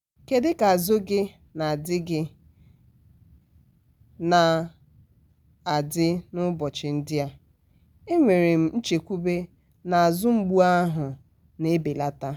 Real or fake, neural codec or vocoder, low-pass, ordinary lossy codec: real; none; 19.8 kHz; none